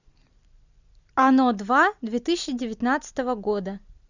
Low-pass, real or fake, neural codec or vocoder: 7.2 kHz; real; none